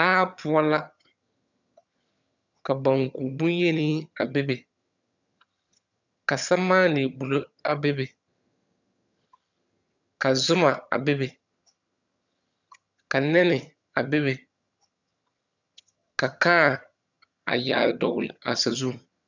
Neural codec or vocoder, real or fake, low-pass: vocoder, 22.05 kHz, 80 mel bands, HiFi-GAN; fake; 7.2 kHz